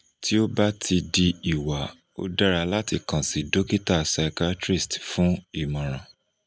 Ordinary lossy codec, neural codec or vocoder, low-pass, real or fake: none; none; none; real